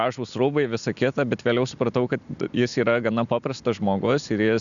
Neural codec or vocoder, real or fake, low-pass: none; real; 7.2 kHz